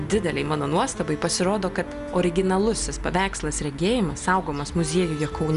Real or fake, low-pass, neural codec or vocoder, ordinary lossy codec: real; 10.8 kHz; none; Opus, 64 kbps